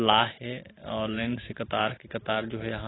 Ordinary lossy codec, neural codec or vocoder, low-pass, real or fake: AAC, 16 kbps; none; 7.2 kHz; real